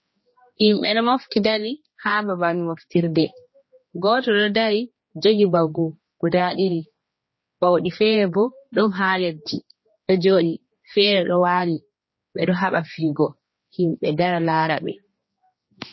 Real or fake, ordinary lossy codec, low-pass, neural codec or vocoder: fake; MP3, 24 kbps; 7.2 kHz; codec, 16 kHz, 2 kbps, X-Codec, HuBERT features, trained on general audio